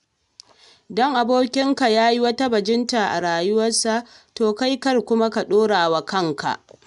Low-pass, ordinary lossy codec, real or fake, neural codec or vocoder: 10.8 kHz; none; real; none